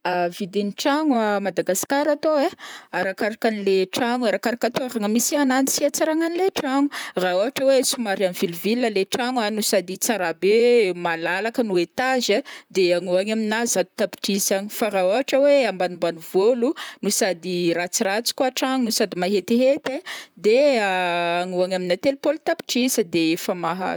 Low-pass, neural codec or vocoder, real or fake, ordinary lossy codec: none; vocoder, 44.1 kHz, 128 mel bands, Pupu-Vocoder; fake; none